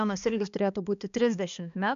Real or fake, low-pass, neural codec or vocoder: fake; 7.2 kHz; codec, 16 kHz, 2 kbps, X-Codec, HuBERT features, trained on balanced general audio